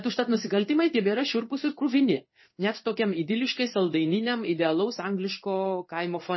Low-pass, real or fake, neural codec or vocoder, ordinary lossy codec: 7.2 kHz; fake; codec, 16 kHz in and 24 kHz out, 1 kbps, XY-Tokenizer; MP3, 24 kbps